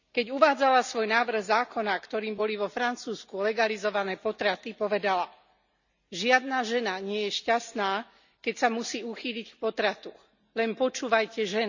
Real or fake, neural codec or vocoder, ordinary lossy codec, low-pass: real; none; none; 7.2 kHz